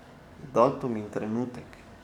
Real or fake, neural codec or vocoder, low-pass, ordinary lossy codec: fake; codec, 44.1 kHz, 7.8 kbps, DAC; 19.8 kHz; none